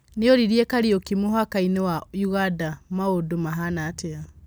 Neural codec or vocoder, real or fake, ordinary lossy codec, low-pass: none; real; none; none